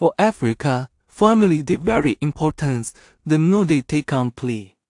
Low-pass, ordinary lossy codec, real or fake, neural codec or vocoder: 10.8 kHz; AAC, 64 kbps; fake; codec, 16 kHz in and 24 kHz out, 0.4 kbps, LongCat-Audio-Codec, two codebook decoder